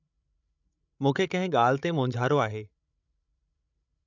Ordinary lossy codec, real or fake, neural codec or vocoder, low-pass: none; fake; codec, 16 kHz, 16 kbps, FreqCodec, larger model; 7.2 kHz